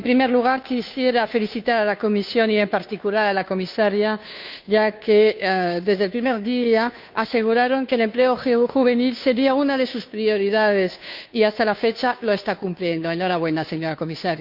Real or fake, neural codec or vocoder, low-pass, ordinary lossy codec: fake; codec, 16 kHz, 2 kbps, FunCodec, trained on Chinese and English, 25 frames a second; 5.4 kHz; none